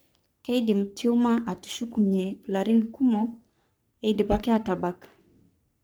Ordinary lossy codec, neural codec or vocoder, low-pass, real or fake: none; codec, 44.1 kHz, 3.4 kbps, Pupu-Codec; none; fake